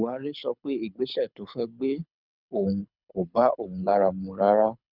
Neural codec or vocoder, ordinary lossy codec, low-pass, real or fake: codec, 24 kHz, 6 kbps, HILCodec; none; 5.4 kHz; fake